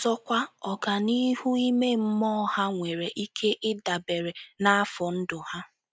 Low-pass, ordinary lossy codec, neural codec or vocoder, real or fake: none; none; none; real